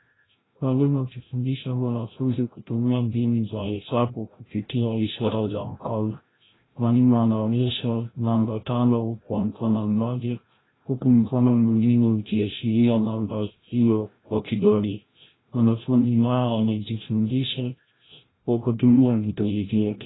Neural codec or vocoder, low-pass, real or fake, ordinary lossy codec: codec, 16 kHz, 0.5 kbps, FreqCodec, larger model; 7.2 kHz; fake; AAC, 16 kbps